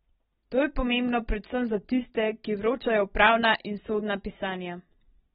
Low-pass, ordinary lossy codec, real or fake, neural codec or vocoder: 19.8 kHz; AAC, 16 kbps; real; none